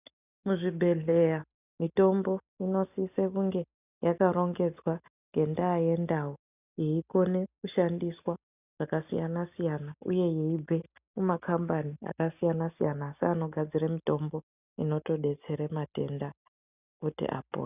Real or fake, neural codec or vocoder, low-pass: real; none; 3.6 kHz